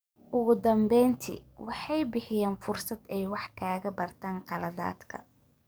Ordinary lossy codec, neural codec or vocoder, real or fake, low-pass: none; codec, 44.1 kHz, 7.8 kbps, DAC; fake; none